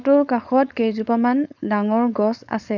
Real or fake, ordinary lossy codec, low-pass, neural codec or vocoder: fake; none; 7.2 kHz; codec, 16 kHz, 4.8 kbps, FACodec